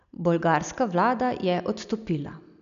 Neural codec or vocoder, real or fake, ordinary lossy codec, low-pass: none; real; none; 7.2 kHz